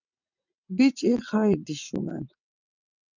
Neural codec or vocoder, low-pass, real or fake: vocoder, 22.05 kHz, 80 mel bands, WaveNeXt; 7.2 kHz; fake